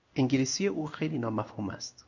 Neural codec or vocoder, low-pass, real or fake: codec, 16 kHz in and 24 kHz out, 1 kbps, XY-Tokenizer; 7.2 kHz; fake